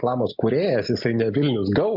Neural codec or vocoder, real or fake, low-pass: none; real; 5.4 kHz